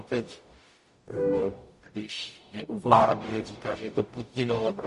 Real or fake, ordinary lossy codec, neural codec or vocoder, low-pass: fake; MP3, 48 kbps; codec, 44.1 kHz, 0.9 kbps, DAC; 14.4 kHz